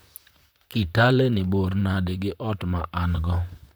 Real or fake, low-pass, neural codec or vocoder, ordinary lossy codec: fake; none; vocoder, 44.1 kHz, 128 mel bands, Pupu-Vocoder; none